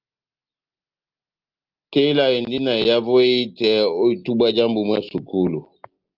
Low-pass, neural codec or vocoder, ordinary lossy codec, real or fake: 5.4 kHz; none; Opus, 32 kbps; real